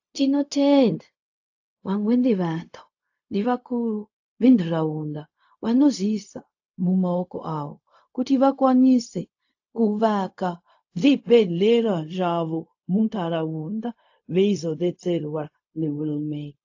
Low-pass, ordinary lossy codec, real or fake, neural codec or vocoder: 7.2 kHz; AAC, 48 kbps; fake; codec, 16 kHz, 0.4 kbps, LongCat-Audio-Codec